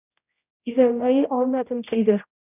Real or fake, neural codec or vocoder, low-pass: fake; codec, 16 kHz, 0.5 kbps, X-Codec, HuBERT features, trained on general audio; 3.6 kHz